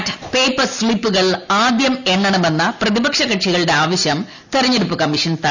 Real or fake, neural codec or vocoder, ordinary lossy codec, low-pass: real; none; none; 7.2 kHz